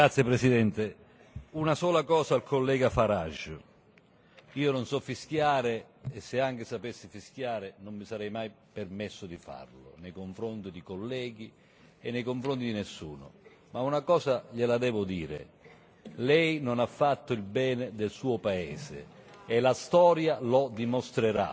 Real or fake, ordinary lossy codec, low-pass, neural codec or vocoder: real; none; none; none